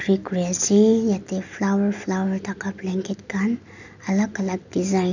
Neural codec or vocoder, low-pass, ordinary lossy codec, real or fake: none; 7.2 kHz; none; real